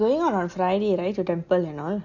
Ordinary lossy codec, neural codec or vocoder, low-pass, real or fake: none; none; 7.2 kHz; real